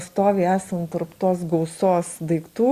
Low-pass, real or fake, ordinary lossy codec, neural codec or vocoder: 14.4 kHz; real; Opus, 64 kbps; none